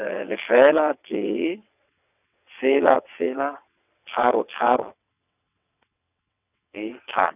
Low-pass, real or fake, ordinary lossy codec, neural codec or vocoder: 3.6 kHz; fake; none; vocoder, 22.05 kHz, 80 mel bands, WaveNeXt